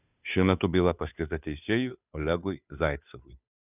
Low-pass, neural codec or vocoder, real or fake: 3.6 kHz; codec, 16 kHz, 2 kbps, FunCodec, trained on Chinese and English, 25 frames a second; fake